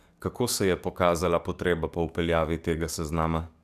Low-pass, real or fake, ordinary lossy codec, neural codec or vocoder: 14.4 kHz; fake; none; codec, 44.1 kHz, 7.8 kbps, DAC